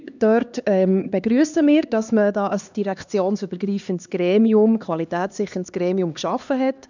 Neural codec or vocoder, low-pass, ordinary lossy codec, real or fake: codec, 16 kHz, 2 kbps, X-Codec, HuBERT features, trained on LibriSpeech; 7.2 kHz; none; fake